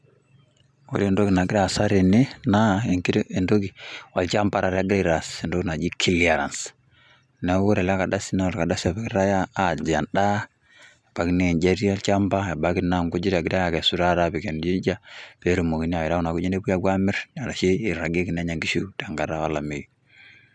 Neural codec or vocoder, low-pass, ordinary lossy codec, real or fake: none; none; none; real